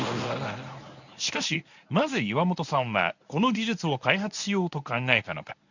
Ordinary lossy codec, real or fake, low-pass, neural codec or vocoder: none; fake; 7.2 kHz; codec, 24 kHz, 0.9 kbps, WavTokenizer, medium speech release version 1